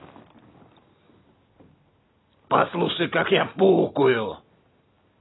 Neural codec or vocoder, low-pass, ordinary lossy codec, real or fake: none; 7.2 kHz; AAC, 16 kbps; real